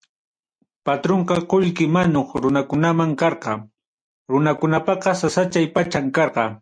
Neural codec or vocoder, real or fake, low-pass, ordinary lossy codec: none; real; 9.9 kHz; MP3, 48 kbps